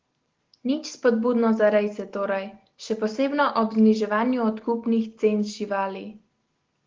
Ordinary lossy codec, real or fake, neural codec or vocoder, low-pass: Opus, 16 kbps; real; none; 7.2 kHz